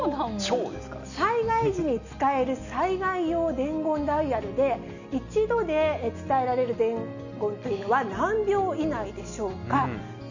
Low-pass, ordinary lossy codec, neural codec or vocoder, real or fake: 7.2 kHz; none; none; real